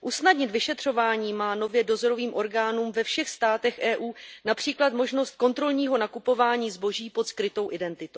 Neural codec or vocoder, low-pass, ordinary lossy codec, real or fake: none; none; none; real